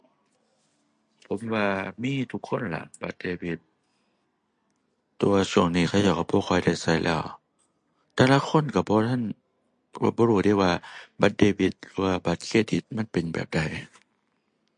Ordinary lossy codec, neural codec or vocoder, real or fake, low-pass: MP3, 48 kbps; none; real; 9.9 kHz